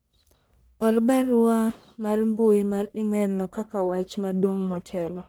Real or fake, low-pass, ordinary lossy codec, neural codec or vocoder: fake; none; none; codec, 44.1 kHz, 1.7 kbps, Pupu-Codec